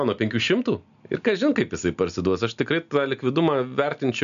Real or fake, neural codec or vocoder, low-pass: real; none; 7.2 kHz